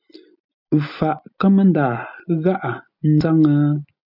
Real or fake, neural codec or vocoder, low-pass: real; none; 5.4 kHz